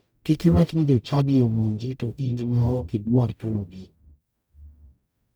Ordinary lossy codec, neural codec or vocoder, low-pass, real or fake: none; codec, 44.1 kHz, 0.9 kbps, DAC; none; fake